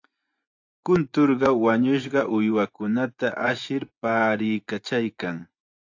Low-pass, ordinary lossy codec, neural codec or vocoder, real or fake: 7.2 kHz; AAC, 48 kbps; vocoder, 24 kHz, 100 mel bands, Vocos; fake